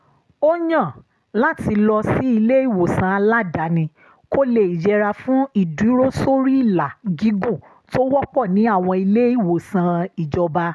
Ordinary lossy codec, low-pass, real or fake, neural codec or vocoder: none; none; real; none